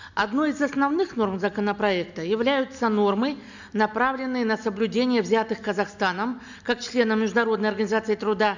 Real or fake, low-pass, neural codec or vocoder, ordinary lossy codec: real; 7.2 kHz; none; none